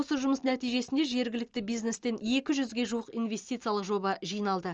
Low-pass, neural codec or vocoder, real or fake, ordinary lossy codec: 7.2 kHz; none; real; Opus, 24 kbps